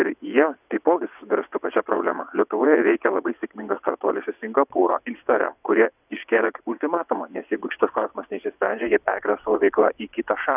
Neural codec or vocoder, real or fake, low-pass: vocoder, 22.05 kHz, 80 mel bands, WaveNeXt; fake; 3.6 kHz